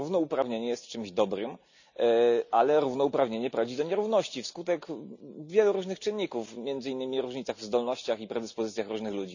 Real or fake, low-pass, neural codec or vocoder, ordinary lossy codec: real; 7.2 kHz; none; none